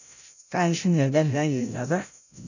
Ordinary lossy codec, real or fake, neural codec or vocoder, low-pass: none; fake; codec, 16 kHz, 0.5 kbps, FreqCodec, larger model; 7.2 kHz